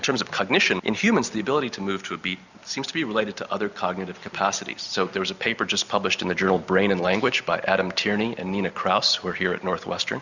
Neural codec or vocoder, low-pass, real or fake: none; 7.2 kHz; real